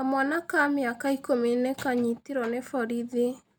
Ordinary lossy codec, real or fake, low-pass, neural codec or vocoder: none; real; none; none